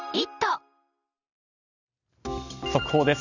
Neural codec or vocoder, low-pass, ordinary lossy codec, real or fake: none; 7.2 kHz; none; real